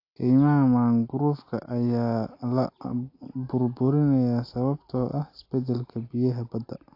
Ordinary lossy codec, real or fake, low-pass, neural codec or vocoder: AAC, 32 kbps; real; 5.4 kHz; none